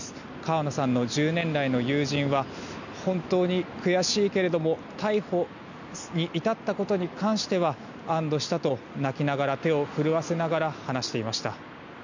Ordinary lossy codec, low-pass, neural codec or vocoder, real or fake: none; 7.2 kHz; none; real